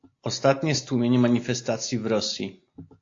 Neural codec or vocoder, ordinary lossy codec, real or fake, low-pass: none; AAC, 48 kbps; real; 7.2 kHz